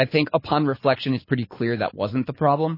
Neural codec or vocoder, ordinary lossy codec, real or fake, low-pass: codec, 24 kHz, 6 kbps, HILCodec; MP3, 24 kbps; fake; 5.4 kHz